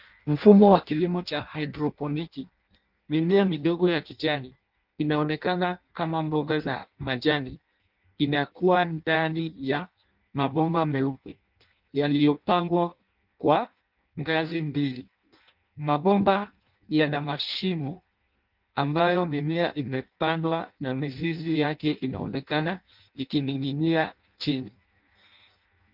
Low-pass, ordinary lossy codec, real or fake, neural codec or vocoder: 5.4 kHz; Opus, 24 kbps; fake; codec, 16 kHz in and 24 kHz out, 0.6 kbps, FireRedTTS-2 codec